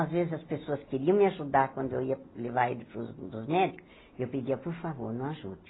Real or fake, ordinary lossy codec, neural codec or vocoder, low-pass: real; AAC, 16 kbps; none; 7.2 kHz